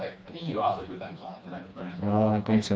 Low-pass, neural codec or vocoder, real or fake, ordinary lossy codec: none; codec, 16 kHz, 2 kbps, FreqCodec, smaller model; fake; none